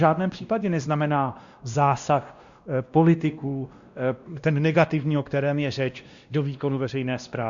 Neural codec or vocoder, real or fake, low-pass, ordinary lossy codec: codec, 16 kHz, 1 kbps, X-Codec, WavLM features, trained on Multilingual LibriSpeech; fake; 7.2 kHz; Opus, 64 kbps